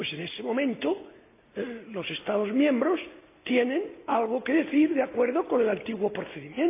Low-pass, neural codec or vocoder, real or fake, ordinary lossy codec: 3.6 kHz; none; real; AAC, 24 kbps